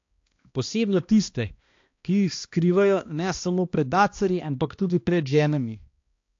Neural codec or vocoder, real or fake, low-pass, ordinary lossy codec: codec, 16 kHz, 1 kbps, X-Codec, HuBERT features, trained on balanced general audio; fake; 7.2 kHz; AAC, 48 kbps